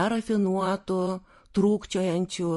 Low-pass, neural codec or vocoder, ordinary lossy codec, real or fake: 14.4 kHz; vocoder, 44.1 kHz, 128 mel bands every 512 samples, BigVGAN v2; MP3, 48 kbps; fake